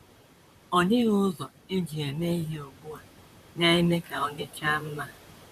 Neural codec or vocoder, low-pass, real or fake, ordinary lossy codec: vocoder, 44.1 kHz, 128 mel bands, Pupu-Vocoder; 14.4 kHz; fake; none